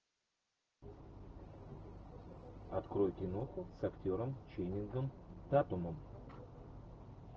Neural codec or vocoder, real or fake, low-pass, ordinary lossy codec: none; real; 7.2 kHz; Opus, 16 kbps